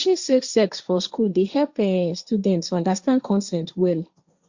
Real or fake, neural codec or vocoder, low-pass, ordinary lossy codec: fake; codec, 16 kHz, 1.1 kbps, Voila-Tokenizer; 7.2 kHz; Opus, 64 kbps